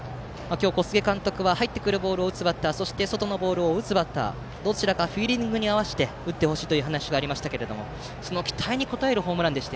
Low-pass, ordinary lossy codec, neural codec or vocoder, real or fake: none; none; none; real